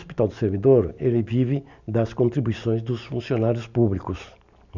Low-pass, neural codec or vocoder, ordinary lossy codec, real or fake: 7.2 kHz; none; none; real